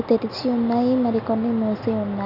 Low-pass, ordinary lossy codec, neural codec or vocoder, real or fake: 5.4 kHz; none; none; real